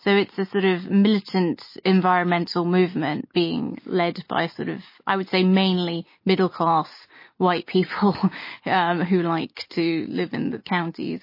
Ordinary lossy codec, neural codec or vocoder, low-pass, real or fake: MP3, 24 kbps; none; 5.4 kHz; real